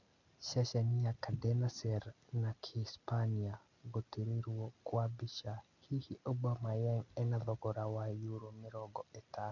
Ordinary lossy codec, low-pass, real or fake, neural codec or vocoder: none; 7.2 kHz; real; none